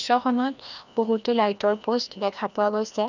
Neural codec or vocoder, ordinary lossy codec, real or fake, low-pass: codec, 16 kHz, 1 kbps, FreqCodec, larger model; none; fake; 7.2 kHz